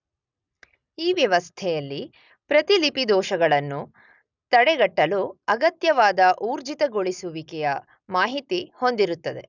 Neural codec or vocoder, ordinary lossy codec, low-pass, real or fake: none; none; 7.2 kHz; real